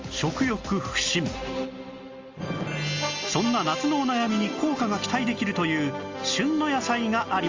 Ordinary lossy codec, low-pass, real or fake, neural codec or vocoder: Opus, 32 kbps; 7.2 kHz; real; none